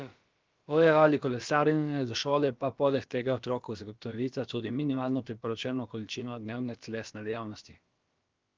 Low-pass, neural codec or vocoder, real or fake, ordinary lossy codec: 7.2 kHz; codec, 16 kHz, about 1 kbps, DyCAST, with the encoder's durations; fake; Opus, 32 kbps